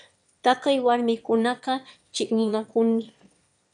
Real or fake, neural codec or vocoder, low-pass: fake; autoencoder, 22.05 kHz, a latent of 192 numbers a frame, VITS, trained on one speaker; 9.9 kHz